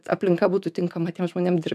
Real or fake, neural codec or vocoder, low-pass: fake; autoencoder, 48 kHz, 128 numbers a frame, DAC-VAE, trained on Japanese speech; 14.4 kHz